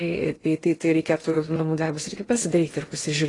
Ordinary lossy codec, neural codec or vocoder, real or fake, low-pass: AAC, 32 kbps; codec, 16 kHz in and 24 kHz out, 0.6 kbps, FocalCodec, streaming, 2048 codes; fake; 10.8 kHz